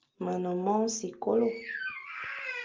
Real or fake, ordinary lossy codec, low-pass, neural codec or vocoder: real; Opus, 24 kbps; 7.2 kHz; none